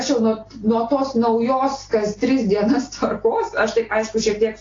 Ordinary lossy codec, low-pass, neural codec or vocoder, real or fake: AAC, 32 kbps; 7.2 kHz; none; real